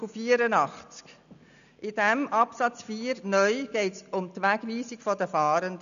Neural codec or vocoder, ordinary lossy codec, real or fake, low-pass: none; none; real; 7.2 kHz